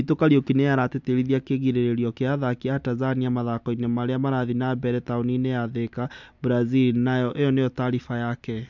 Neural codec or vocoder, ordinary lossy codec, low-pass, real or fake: none; MP3, 64 kbps; 7.2 kHz; real